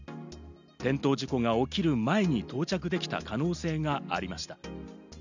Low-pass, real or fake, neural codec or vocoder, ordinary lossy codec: 7.2 kHz; real; none; none